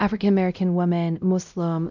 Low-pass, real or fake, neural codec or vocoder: 7.2 kHz; fake; codec, 16 kHz, 0.5 kbps, X-Codec, WavLM features, trained on Multilingual LibriSpeech